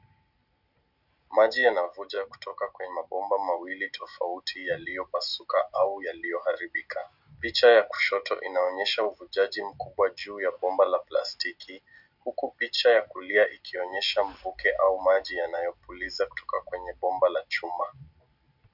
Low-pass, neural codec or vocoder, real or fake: 5.4 kHz; none; real